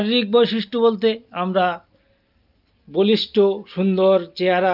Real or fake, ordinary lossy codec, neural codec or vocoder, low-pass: real; Opus, 24 kbps; none; 5.4 kHz